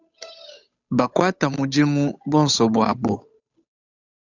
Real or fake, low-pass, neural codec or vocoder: fake; 7.2 kHz; codec, 16 kHz, 8 kbps, FunCodec, trained on Chinese and English, 25 frames a second